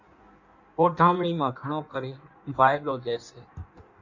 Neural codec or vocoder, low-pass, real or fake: codec, 16 kHz in and 24 kHz out, 1.1 kbps, FireRedTTS-2 codec; 7.2 kHz; fake